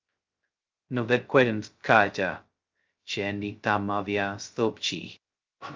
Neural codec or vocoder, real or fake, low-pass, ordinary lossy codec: codec, 16 kHz, 0.2 kbps, FocalCodec; fake; 7.2 kHz; Opus, 32 kbps